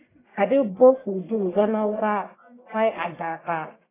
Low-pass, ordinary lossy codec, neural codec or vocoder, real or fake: 3.6 kHz; AAC, 16 kbps; codec, 44.1 kHz, 1.7 kbps, Pupu-Codec; fake